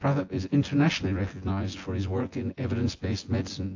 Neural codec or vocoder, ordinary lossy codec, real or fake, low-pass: vocoder, 24 kHz, 100 mel bands, Vocos; AAC, 48 kbps; fake; 7.2 kHz